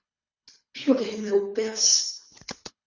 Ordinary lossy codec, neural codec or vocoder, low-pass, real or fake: Opus, 64 kbps; codec, 24 kHz, 3 kbps, HILCodec; 7.2 kHz; fake